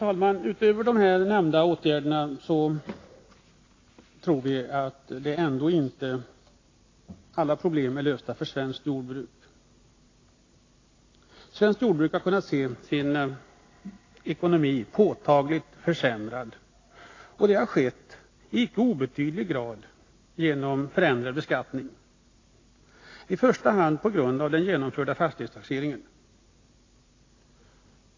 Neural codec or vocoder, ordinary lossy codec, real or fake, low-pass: none; AAC, 32 kbps; real; 7.2 kHz